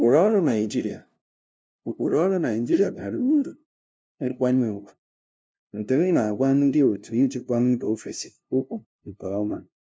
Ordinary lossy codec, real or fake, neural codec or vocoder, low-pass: none; fake; codec, 16 kHz, 0.5 kbps, FunCodec, trained on LibriTTS, 25 frames a second; none